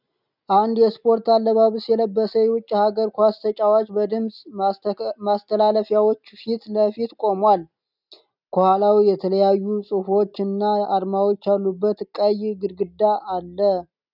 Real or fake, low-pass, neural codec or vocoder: real; 5.4 kHz; none